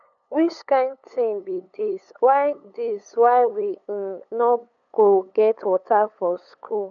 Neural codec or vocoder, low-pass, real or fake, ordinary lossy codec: codec, 16 kHz, 8 kbps, FunCodec, trained on LibriTTS, 25 frames a second; 7.2 kHz; fake; none